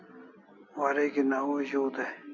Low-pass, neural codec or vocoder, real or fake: 7.2 kHz; none; real